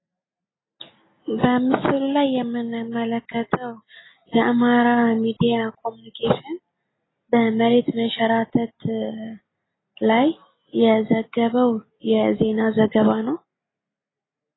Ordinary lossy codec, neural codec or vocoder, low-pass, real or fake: AAC, 16 kbps; none; 7.2 kHz; real